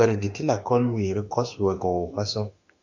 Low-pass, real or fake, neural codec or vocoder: 7.2 kHz; fake; autoencoder, 48 kHz, 32 numbers a frame, DAC-VAE, trained on Japanese speech